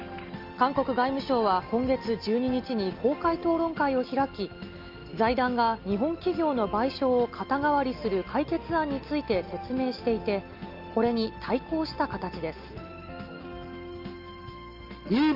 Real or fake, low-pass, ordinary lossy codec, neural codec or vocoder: real; 5.4 kHz; Opus, 24 kbps; none